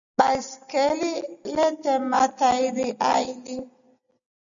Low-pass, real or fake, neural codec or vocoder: 7.2 kHz; real; none